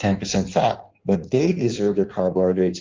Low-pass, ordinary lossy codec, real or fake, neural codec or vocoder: 7.2 kHz; Opus, 24 kbps; fake; codec, 16 kHz in and 24 kHz out, 1.1 kbps, FireRedTTS-2 codec